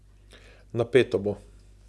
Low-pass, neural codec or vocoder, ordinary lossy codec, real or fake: none; none; none; real